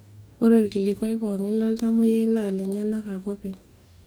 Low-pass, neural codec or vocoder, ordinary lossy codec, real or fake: none; codec, 44.1 kHz, 2.6 kbps, DAC; none; fake